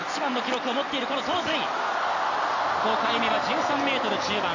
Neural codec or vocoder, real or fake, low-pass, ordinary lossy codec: none; real; 7.2 kHz; AAC, 48 kbps